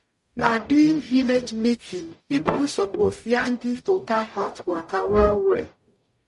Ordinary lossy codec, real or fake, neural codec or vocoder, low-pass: MP3, 48 kbps; fake; codec, 44.1 kHz, 0.9 kbps, DAC; 14.4 kHz